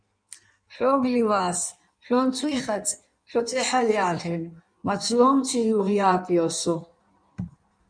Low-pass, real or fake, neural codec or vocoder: 9.9 kHz; fake; codec, 16 kHz in and 24 kHz out, 1.1 kbps, FireRedTTS-2 codec